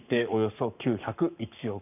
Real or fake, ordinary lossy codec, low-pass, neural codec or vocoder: fake; none; 3.6 kHz; codec, 44.1 kHz, 7.8 kbps, Pupu-Codec